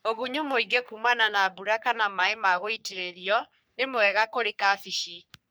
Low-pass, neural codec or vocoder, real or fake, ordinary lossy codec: none; codec, 44.1 kHz, 3.4 kbps, Pupu-Codec; fake; none